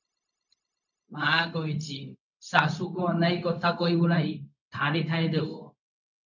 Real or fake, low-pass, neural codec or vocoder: fake; 7.2 kHz; codec, 16 kHz, 0.4 kbps, LongCat-Audio-Codec